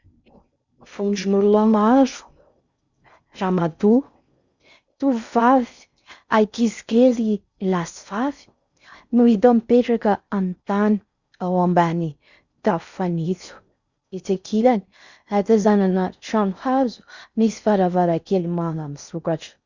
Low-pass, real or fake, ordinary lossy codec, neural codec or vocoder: 7.2 kHz; fake; Opus, 64 kbps; codec, 16 kHz in and 24 kHz out, 0.6 kbps, FocalCodec, streaming, 4096 codes